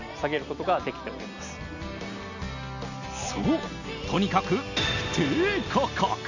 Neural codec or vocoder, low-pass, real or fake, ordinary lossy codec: none; 7.2 kHz; real; none